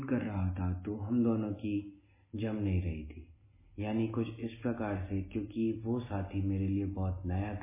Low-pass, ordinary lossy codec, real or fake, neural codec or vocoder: 3.6 kHz; MP3, 16 kbps; real; none